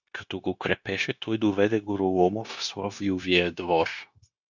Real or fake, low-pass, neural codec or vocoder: fake; 7.2 kHz; codec, 16 kHz, 0.9 kbps, LongCat-Audio-Codec